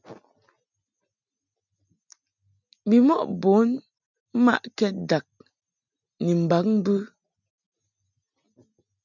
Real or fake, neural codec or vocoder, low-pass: real; none; 7.2 kHz